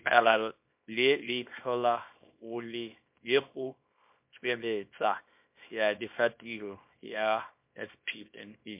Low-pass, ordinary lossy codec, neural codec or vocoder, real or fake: 3.6 kHz; MP3, 32 kbps; codec, 24 kHz, 0.9 kbps, WavTokenizer, small release; fake